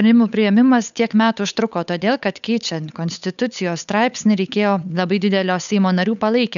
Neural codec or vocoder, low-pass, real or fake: codec, 16 kHz, 8 kbps, FunCodec, trained on Chinese and English, 25 frames a second; 7.2 kHz; fake